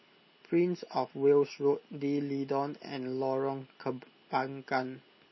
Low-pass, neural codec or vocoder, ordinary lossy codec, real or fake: 7.2 kHz; none; MP3, 24 kbps; real